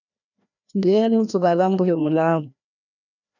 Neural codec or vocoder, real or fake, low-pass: codec, 16 kHz, 1 kbps, FreqCodec, larger model; fake; 7.2 kHz